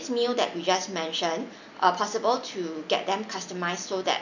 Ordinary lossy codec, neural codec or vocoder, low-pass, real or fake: none; none; 7.2 kHz; real